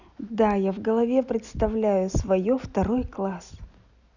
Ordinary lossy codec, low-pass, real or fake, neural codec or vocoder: none; 7.2 kHz; real; none